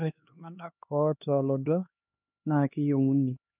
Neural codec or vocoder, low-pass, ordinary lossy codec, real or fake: codec, 16 kHz, 2 kbps, X-Codec, HuBERT features, trained on LibriSpeech; 3.6 kHz; none; fake